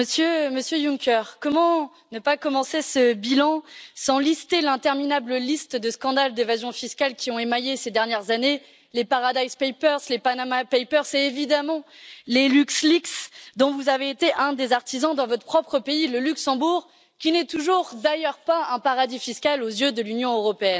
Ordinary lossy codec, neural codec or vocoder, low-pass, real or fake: none; none; none; real